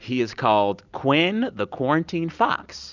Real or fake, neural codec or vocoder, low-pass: real; none; 7.2 kHz